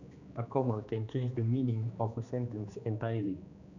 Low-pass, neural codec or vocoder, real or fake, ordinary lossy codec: 7.2 kHz; codec, 16 kHz, 2 kbps, X-Codec, HuBERT features, trained on general audio; fake; none